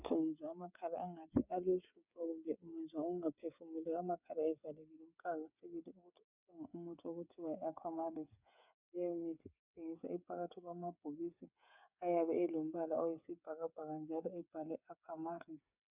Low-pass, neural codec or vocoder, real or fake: 3.6 kHz; codec, 16 kHz, 8 kbps, FreqCodec, smaller model; fake